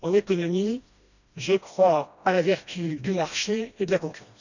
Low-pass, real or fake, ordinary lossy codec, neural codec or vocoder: 7.2 kHz; fake; none; codec, 16 kHz, 1 kbps, FreqCodec, smaller model